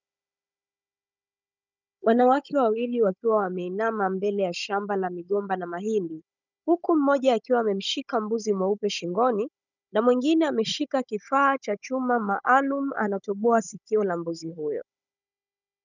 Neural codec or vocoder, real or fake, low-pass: codec, 16 kHz, 16 kbps, FunCodec, trained on Chinese and English, 50 frames a second; fake; 7.2 kHz